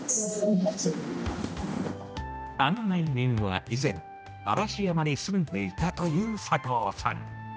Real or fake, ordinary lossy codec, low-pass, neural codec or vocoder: fake; none; none; codec, 16 kHz, 1 kbps, X-Codec, HuBERT features, trained on general audio